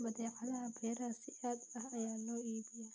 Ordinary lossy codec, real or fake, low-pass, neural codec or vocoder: none; real; none; none